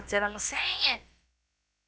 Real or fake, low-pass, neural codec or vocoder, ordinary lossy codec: fake; none; codec, 16 kHz, about 1 kbps, DyCAST, with the encoder's durations; none